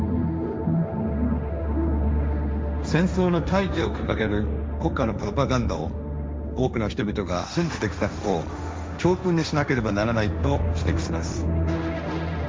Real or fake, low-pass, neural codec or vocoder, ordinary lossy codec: fake; none; codec, 16 kHz, 1.1 kbps, Voila-Tokenizer; none